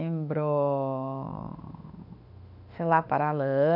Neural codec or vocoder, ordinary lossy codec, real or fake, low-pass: autoencoder, 48 kHz, 32 numbers a frame, DAC-VAE, trained on Japanese speech; none; fake; 5.4 kHz